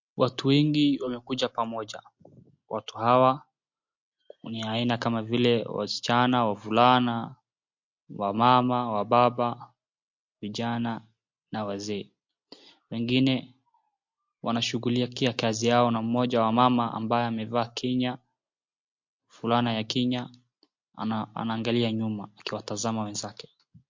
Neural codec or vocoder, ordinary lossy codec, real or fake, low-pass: none; MP3, 48 kbps; real; 7.2 kHz